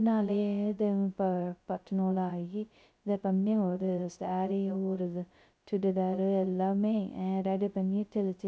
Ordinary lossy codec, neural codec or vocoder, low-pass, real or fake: none; codec, 16 kHz, 0.2 kbps, FocalCodec; none; fake